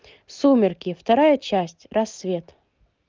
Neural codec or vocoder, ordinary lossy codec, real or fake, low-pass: none; Opus, 24 kbps; real; 7.2 kHz